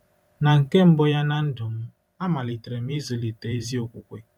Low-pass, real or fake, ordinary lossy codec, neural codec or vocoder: 19.8 kHz; fake; none; vocoder, 44.1 kHz, 128 mel bands every 512 samples, BigVGAN v2